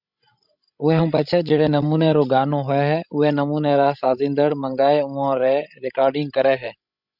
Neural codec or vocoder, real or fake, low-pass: codec, 16 kHz, 16 kbps, FreqCodec, larger model; fake; 5.4 kHz